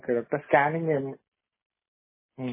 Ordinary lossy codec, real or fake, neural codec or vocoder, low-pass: MP3, 16 kbps; real; none; 3.6 kHz